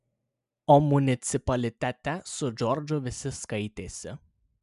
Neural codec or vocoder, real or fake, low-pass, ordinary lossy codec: none; real; 10.8 kHz; MP3, 96 kbps